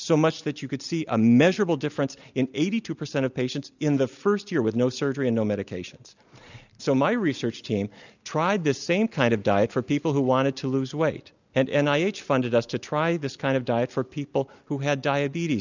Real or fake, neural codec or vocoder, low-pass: real; none; 7.2 kHz